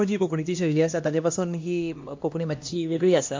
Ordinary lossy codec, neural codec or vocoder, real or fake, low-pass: MP3, 48 kbps; codec, 16 kHz, 1 kbps, X-Codec, HuBERT features, trained on LibriSpeech; fake; 7.2 kHz